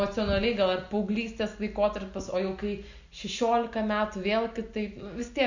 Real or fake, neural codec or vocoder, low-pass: real; none; 7.2 kHz